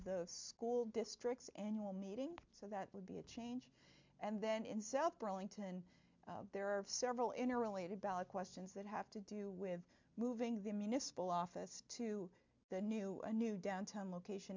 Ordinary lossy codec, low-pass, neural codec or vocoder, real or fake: MP3, 64 kbps; 7.2 kHz; none; real